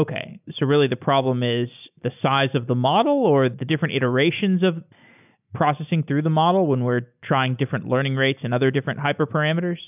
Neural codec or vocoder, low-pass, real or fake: none; 3.6 kHz; real